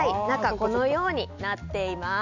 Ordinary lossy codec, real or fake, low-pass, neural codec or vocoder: none; real; 7.2 kHz; none